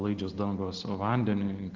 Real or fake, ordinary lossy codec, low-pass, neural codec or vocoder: real; Opus, 16 kbps; 7.2 kHz; none